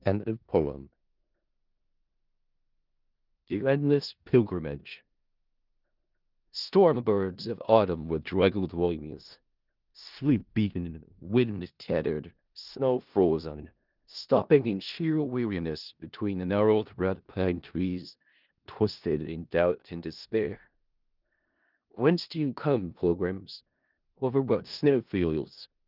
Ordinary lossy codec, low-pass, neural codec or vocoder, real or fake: Opus, 32 kbps; 5.4 kHz; codec, 16 kHz in and 24 kHz out, 0.4 kbps, LongCat-Audio-Codec, four codebook decoder; fake